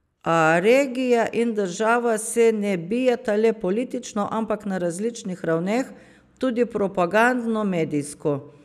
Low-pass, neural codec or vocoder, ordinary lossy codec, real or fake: 14.4 kHz; none; none; real